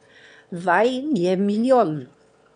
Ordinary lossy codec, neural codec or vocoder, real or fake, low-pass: none; autoencoder, 22.05 kHz, a latent of 192 numbers a frame, VITS, trained on one speaker; fake; 9.9 kHz